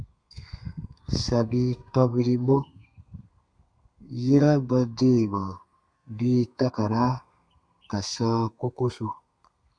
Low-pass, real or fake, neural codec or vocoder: 9.9 kHz; fake; codec, 32 kHz, 1.9 kbps, SNAC